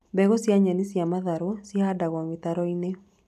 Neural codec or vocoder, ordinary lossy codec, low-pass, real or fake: none; none; 14.4 kHz; real